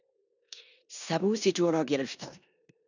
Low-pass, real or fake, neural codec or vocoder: 7.2 kHz; fake; codec, 16 kHz in and 24 kHz out, 0.9 kbps, LongCat-Audio-Codec, four codebook decoder